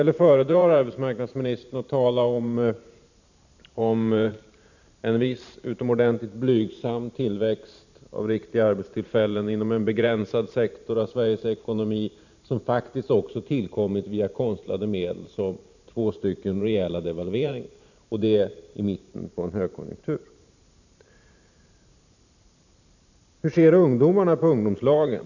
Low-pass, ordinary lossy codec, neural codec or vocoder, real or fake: 7.2 kHz; none; vocoder, 44.1 kHz, 128 mel bands every 512 samples, BigVGAN v2; fake